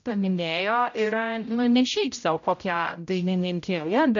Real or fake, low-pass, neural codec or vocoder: fake; 7.2 kHz; codec, 16 kHz, 0.5 kbps, X-Codec, HuBERT features, trained on general audio